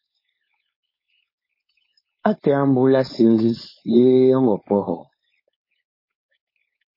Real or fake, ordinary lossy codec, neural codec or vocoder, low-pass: fake; MP3, 24 kbps; codec, 16 kHz, 4.8 kbps, FACodec; 5.4 kHz